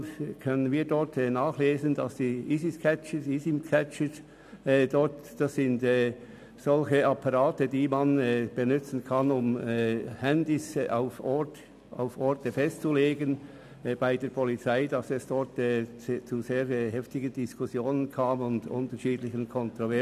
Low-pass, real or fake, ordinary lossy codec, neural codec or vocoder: 14.4 kHz; real; none; none